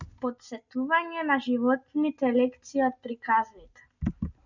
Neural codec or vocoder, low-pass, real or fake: none; 7.2 kHz; real